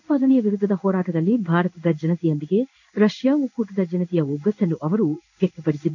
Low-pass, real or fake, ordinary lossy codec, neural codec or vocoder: 7.2 kHz; fake; none; codec, 16 kHz in and 24 kHz out, 1 kbps, XY-Tokenizer